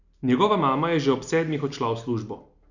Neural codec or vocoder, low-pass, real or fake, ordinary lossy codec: none; 7.2 kHz; real; none